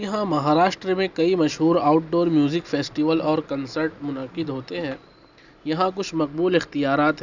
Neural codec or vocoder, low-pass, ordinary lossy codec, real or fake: none; 7.2 kHz; none; real